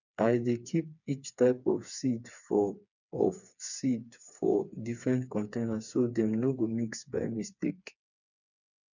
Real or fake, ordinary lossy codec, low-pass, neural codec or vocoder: fake; none; 7.2 kHz; codec, 16 kHz, 4 kbps, FreqCodec, smaller model